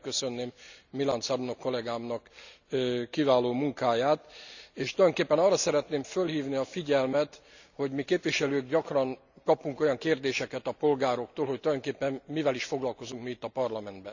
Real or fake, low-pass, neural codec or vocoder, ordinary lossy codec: real; 7.2 kHz; none; none